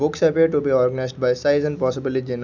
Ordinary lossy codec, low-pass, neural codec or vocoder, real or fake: none; 7.2 kHz; none; real